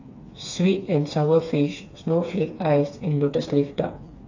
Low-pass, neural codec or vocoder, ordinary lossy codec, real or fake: 7.2 kHz; codec, 16 kHz, 4 kbps, FreqCodec, smaller model; AAC, 48 kbps; fake